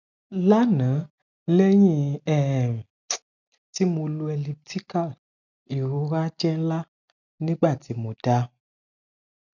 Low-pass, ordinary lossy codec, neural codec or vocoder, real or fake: 7.2 kHz; none; none; real